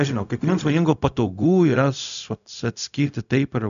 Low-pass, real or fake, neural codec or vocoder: 7.2 kHz; fake; codec, 16 kHz, 0.4 kbps, LongCat-Audio-Codec